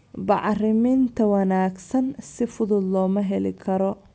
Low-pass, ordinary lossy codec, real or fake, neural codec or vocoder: none; none; real; none